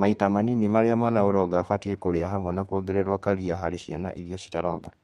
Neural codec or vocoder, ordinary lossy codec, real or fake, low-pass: codec, 32 kHz, 1.9 kbps, SNAC; MP3, 64 kbps; fake; 14.4 kHz